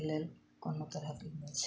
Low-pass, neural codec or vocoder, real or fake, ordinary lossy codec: none; none; real; none